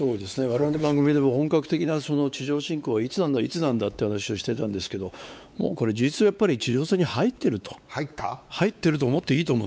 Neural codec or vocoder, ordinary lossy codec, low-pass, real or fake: codec, 16 kHz, 4 kbps, X-Codec, WavLM features, trained on Multilingual LibriSpeech; none; none; fake